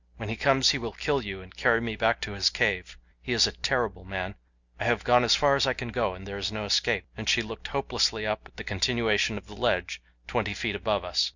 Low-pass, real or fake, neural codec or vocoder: 7.2 kHz; real; none